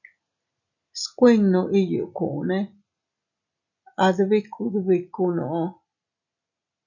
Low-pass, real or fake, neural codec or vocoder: 7.2 kHz; real; none